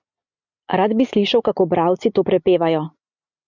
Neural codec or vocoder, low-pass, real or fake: none; 7.2 kHz; real